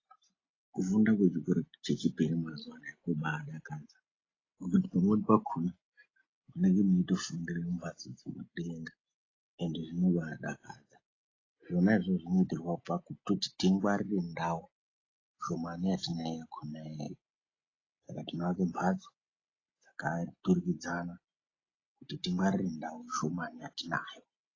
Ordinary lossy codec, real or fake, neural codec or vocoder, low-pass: AAC, 32 kbps; real; none; 7.2 kHz